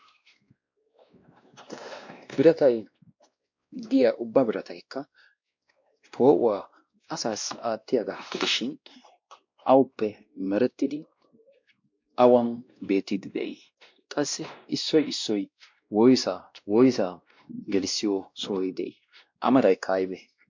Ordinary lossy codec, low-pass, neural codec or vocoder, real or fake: MP3, 48 kbps; 7.2 kHz; codec, 16 kHz, 1 kbps, X-Codec, WavLM features, trained on Multilingual LibriSpeech; fake